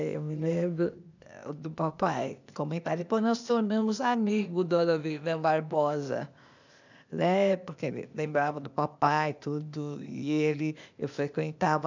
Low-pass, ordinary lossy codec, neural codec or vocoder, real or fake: 7.2 kHz; none; codec, 16 kHz, 0.8 kbps, ZipCodec; fake